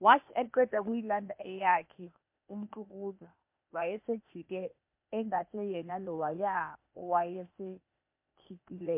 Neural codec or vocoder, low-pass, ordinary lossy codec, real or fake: codec, 16 kHz, 0.8 kbps, ZipCodec; 3.6 kHz; MP3, 32 kbps; fake